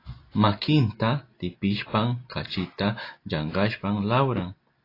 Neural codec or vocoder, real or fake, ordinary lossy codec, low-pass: none; real; AAC, 32 kbps; 5.4 kHz